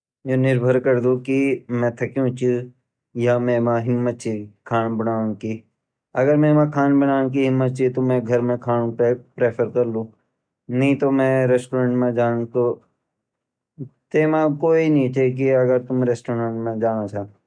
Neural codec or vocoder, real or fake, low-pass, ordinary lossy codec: none; real; 9.9 kHz; none